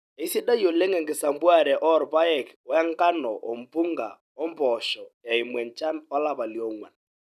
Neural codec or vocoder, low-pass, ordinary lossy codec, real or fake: none; 14.4 kHz; none; real